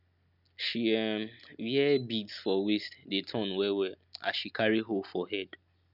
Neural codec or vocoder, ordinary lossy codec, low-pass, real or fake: none; none; 5.4 kHz; real